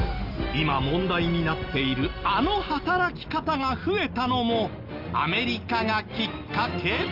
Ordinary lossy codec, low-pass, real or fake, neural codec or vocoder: Opus, 24 kbps; 5.4 kHz; real; none